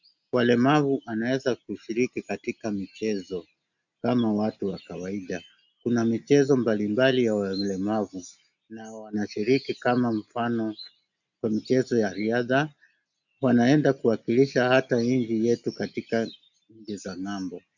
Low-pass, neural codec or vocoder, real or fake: 7.2 kHz; none; real